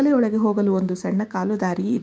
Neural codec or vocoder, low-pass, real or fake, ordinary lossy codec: codec, 16 kHz, 6 kbps, DAC; none; fake; none